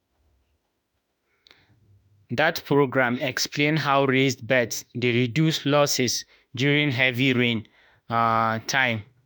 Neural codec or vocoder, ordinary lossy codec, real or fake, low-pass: autoencoder, 48 kHz, 32 numbers a frame, DAC-VAE, trained on Japanese speech; none; fake; none